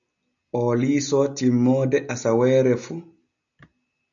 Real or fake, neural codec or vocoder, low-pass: real; none; 7.2 kHz